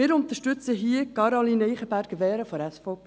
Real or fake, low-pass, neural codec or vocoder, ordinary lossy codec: real; none; none; none